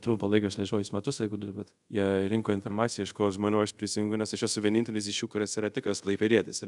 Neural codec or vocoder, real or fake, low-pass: codec, 24 kHz, 0.5 kbps, DualCodec; fake; 10.8 kHz